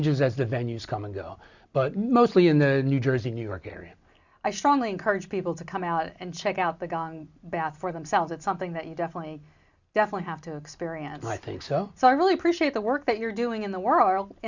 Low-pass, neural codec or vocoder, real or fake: 7.2 kHz; none; real